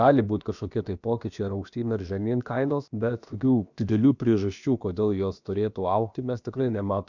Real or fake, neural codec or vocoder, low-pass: fake; codec, 16 kHz, about 1 kbps, DyCAST, with the encoder's durations; 7.2 kHz